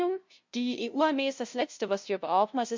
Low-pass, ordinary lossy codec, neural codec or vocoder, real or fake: 7.2 kHz; MP3, 64 kbps; codec, 16 kHz, 0.5 kbps, FunCodec, trained on LibriTTS, 25 frames a second; fake